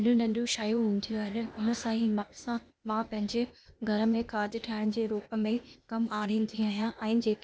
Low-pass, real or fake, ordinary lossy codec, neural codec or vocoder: none; fake; none; codec, 16 kHz, 0.8 kbps, ZipCodec